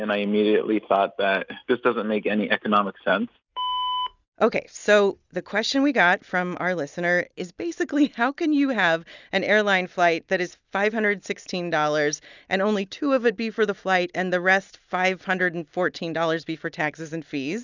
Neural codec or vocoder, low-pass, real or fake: none; 7.2 kHz; real